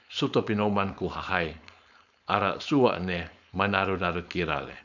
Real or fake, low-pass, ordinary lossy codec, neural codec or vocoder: fake; 7.2 kHz; none; codec, 16 kHz, 4.8 kbps, FACodec